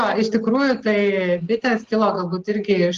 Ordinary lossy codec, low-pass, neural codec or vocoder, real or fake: Opus, 16 kbps; 7.2 kHz; none; real